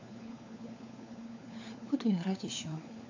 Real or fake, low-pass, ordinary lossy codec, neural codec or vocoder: fake; 7.2 kHz; AAC, 48 kbps; codec, 16 kHz, 4 kbps, FunCodec, trained on LibriTTS, 50 frames a second